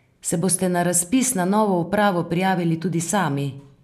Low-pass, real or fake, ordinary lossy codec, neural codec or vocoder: 14.4 kHz; real; MP3, 96 kbps; none